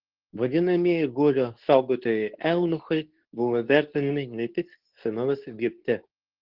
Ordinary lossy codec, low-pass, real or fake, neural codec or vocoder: Opus, 16 kbps; 5.4 kHz; fake; codec, 24 kHz, 0.9 kbps, WavTokenizer, medium speech release version 2